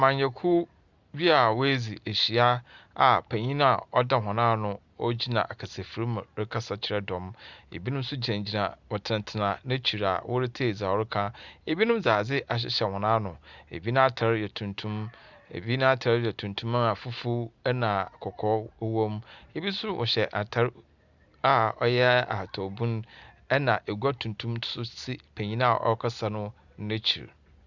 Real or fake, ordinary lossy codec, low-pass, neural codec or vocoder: real; Opus, 64 kbps; 7.2 kHz; none